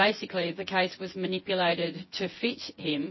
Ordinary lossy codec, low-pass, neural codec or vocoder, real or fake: MP3, 24 kbps; 7.2 kHz; vocoder, 24 kHz, 100 mel bands, Vocos; fake